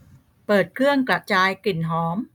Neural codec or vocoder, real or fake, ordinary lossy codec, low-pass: none; real; none; 19.8 kHz